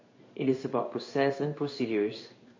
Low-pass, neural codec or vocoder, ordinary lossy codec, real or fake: 7.2 kHz; codec, 16 kHz in and 24 kHz out, 1 kbps, XY-Tokenizer; MP3, 32 kbps; fake